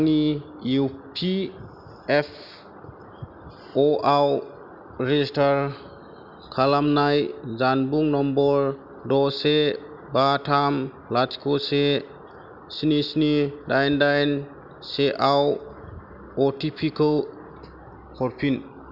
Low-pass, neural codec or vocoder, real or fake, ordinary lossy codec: 5.4 kHz; none; real; none